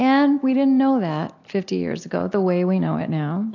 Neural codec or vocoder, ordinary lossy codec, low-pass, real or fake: none; AAC, 48 kbps; 7.2 kHz; real